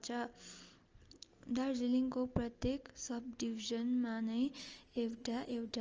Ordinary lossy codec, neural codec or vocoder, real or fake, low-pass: Opus, 24 kbps; none; real; 7.2 kHz